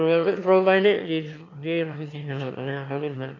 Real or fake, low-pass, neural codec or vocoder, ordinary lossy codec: fake; 7.2 kHz; autoencoder, 22.05 kHz, a latent of 192 numbers a frame, VITS, trained on one speaker; AAC, 48 kbps